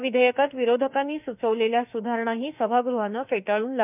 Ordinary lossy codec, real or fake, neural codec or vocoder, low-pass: none; fake; autoencoder, 48 kHz, 32 numbers a frame, DAC-VAE, trained on Japanese speech; 3.6 kHz